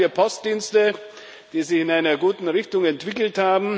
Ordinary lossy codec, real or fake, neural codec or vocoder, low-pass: none; real; none; none